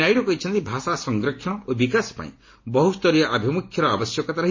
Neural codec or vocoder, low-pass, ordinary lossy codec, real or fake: none; 7.2 kHz; MP3, 32 kbps; real